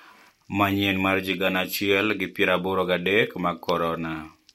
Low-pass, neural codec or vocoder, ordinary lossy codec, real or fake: 19.8 kHz; none; MP3, 64 kbps; real